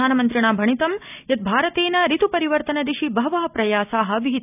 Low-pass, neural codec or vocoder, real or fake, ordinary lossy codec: 3.6 kHz; none; real; none